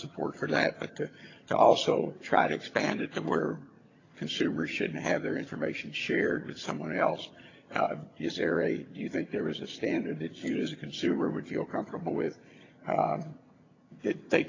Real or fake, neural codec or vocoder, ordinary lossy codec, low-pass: fake; vocoder, 22.05 kHz, 80 mel bands, HiFi-GAN; AAC, 32 kbps; 7.2 kHz